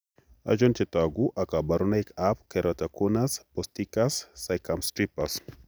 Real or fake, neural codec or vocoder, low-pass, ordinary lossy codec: real; none; none; none